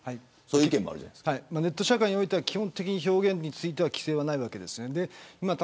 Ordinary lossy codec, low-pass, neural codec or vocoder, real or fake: none; none; none; real